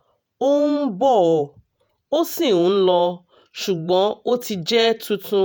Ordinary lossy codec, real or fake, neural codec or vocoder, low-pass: none; fake; vocoder, 48 kHz, 128 mel bands, Vocos; none